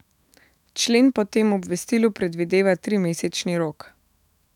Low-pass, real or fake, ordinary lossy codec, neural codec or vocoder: 19.8 kHz; fake; none; autoencoder, 48 kHz, 128 numbers a frame, DAC-VAE, trained on Japanese speech